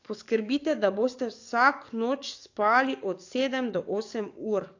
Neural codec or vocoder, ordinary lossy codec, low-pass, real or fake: codec, 44.1 kHz, 7.8 kbps, DAC; AAC, 48 kbps; 7.2 kHz; fake